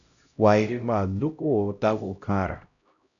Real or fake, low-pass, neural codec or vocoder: fake; 7.2 kHz; codec, 16 kHz, 0.5 kbps, X-Codec, HuBERT features, trained on LibriSpeech